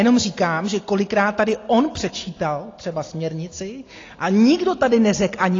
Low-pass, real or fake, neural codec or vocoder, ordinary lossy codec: 7.2 kHz; real; none; AAC, 32 kbps